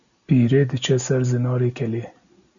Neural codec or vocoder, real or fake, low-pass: none; real; 7.2 kHz